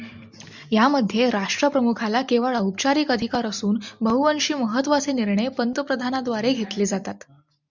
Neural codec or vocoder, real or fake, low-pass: none; real; 7.2 kHz